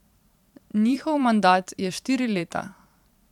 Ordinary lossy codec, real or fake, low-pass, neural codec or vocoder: none; fake; 19.8 kHz; vocoder, 44.1 kHz, 128 mel bands every 512 samples, BigVGAN v2